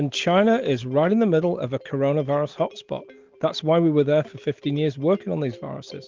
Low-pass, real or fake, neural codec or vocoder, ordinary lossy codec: 7.2 kHz; real; none; Opus, 32 kbps